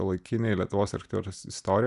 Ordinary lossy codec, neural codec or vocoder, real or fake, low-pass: MP3, 96 kbps; none; real; 10.8 kHz